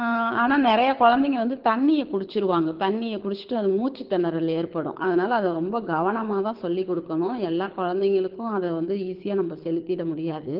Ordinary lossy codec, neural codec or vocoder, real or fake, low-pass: Opus, 16 kbps; codec, 24 kHz, 6 kbps, HILCodec; fake; 5.4 kHz